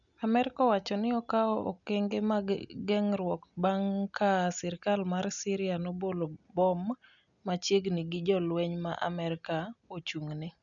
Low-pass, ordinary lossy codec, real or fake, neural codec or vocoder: 7.2 kHz; none; real; none